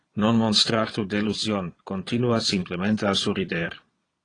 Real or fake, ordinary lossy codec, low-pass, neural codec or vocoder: fake; AAC, 32 kbps; 9.9 kHz; vocoder, 22.05 kHz, 80 mel bands, Vocos